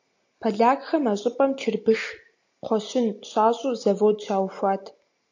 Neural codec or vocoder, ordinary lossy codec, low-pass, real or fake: none; AAC, 48 kbps; 7.2 kHz; real